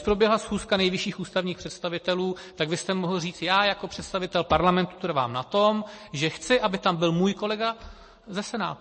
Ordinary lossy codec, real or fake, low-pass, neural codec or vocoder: MP3, 32 kbps; real; 9.9 kHz; none